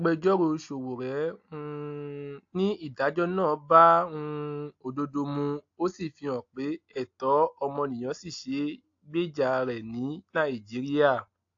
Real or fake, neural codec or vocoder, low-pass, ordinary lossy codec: real; none; 7.2 kHz; AAC, 48 kbps